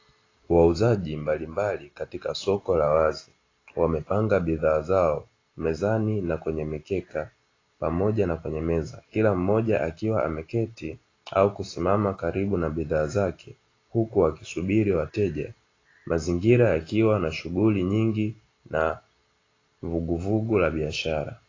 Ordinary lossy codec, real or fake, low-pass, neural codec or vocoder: AAC, 32 kbps; real; 7.2 kHz; none